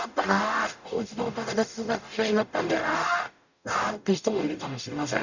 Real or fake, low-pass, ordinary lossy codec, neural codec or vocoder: fake; 7.2 kHz; none; codec, 44.1 kHz, 0.9 kbps, DAC